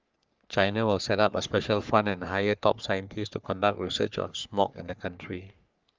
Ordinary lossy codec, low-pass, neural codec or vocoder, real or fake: Opus, 24 kbps; 7.2 kHz; codec, 44.1 kHz, 3.4 kbps, Pupu-Codec; fake